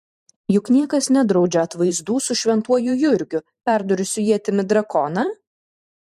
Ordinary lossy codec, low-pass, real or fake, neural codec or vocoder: MP3, 64 kbps; 14.4 kHz; fake; vocoder, 44.1 kHz, 128 mel bands every 512 samples, BigVGAN v2